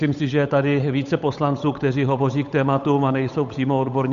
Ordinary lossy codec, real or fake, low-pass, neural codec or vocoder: MP3, 96 kbps; fake; 7.2 kHz; codec, 16 kHz, 8 kbps, FunCodec, trained on Chinese and English, 25 frames a second